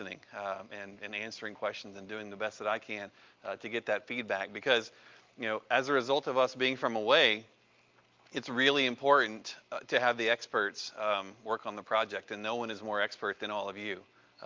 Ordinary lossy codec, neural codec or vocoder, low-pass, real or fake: Opus, 24 kbps; none; 7.2 kHz; real